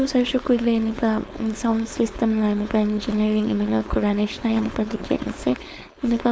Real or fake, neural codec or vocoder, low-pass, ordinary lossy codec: fake; codec, 16 kHz, 4.8 kbps, FACodec; none; none